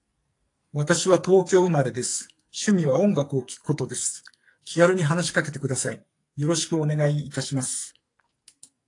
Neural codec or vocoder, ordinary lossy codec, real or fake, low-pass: codec, 44.1 kHz, 2.6 kbps, SNAC; AAC, 48 kbps; fake; 10.8 kHz